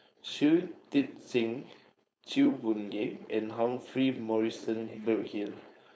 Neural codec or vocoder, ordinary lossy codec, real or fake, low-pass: codec, 16 kHz, 4.8 kbps, FACodec; none; fake; none